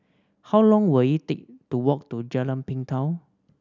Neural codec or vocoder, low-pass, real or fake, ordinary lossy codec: none; 7.2 kHz; real; none